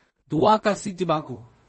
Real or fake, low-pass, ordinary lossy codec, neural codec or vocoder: fake; 10.8 kHz; MP3, 32 kbps; codec, 16 kHz in and 24 kHz out, 0.4 kbps, LongCat-Audio-Codec, two codebook decoder